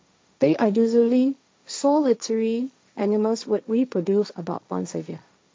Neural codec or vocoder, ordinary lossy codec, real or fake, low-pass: codec, 16 kHz, 1.1 kbps, Voila-Tokenizer; none; fake; none